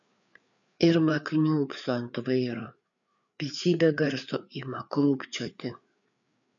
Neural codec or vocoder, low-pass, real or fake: codec, 16 kHz, 4 kbps, FreqCodec, larger model; 7.2 kHz; fake